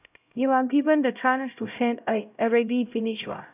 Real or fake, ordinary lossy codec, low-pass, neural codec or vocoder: fake; none; 3.6 kHz; codec, 16 kHz, 0.5 kbps, X-Codec, HuBERT features, trained on LibriSpeech